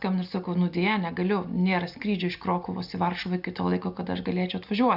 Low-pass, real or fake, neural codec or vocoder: 5.4 kHz; real; none